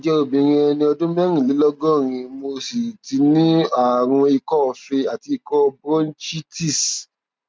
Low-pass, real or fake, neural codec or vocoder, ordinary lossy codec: none; real; none; none